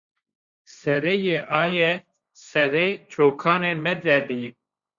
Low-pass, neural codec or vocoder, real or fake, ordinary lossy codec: 7.2 kHz; codec, 16 kHz, 1.1 kbps, Voila-Tokenizer; fake; Opus, 64 kbps